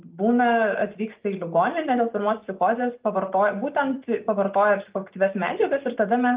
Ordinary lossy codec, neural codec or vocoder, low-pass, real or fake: Opus, 32 kbps; none; 3.6 kHz; real